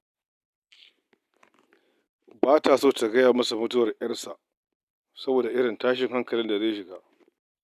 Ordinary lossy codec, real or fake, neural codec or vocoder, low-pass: none; real; none; 14.4 kHz